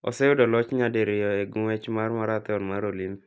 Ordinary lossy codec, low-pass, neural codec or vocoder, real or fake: none; none; none; real